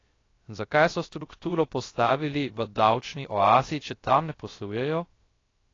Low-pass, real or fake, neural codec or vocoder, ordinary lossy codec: 7.2 kHz; fake; codec, 16 kHz, 0.3 kbps, FocalCodec; AAC, 32 kbps